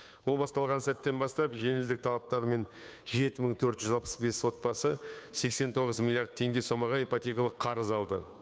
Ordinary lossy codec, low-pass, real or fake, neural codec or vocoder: none; none; fake; codec, 16 kHz, 2 kbps, FunCodec, trained on Chinese and English, 25 frames a second